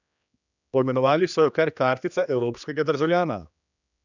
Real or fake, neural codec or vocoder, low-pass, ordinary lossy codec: fake; codec, 16 kHz, 2 kbps, X-Codec, HuBERT features, trained on general audio; 7.2 kHz; none